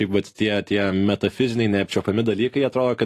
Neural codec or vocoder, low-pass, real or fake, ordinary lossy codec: none; 14.4 kHz; real; AAC, 48 kbps